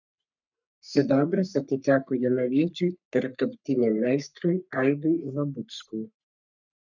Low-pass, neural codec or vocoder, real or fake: 7.2 kHz; codec, 44.1 kHz, 3.4 kbps, Pupu-Codec; fake